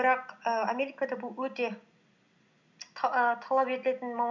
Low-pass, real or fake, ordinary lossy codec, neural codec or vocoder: 7.2 kHz; real; none; none